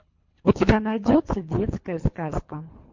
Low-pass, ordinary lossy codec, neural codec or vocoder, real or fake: 7.2 kHz; MP3, 48 kbps; codec, 24 kHz, 3 kbps, HILCodec; fake